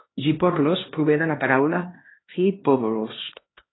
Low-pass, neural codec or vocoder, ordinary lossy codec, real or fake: 7.2 kHz; codec, 16 kHz, 1 kbps, X-Codec, HuBERT features, trained on LibriSpeech; AAC, 16 kbps; fake